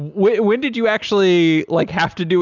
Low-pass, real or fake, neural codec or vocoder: 7.2 kHz; real; none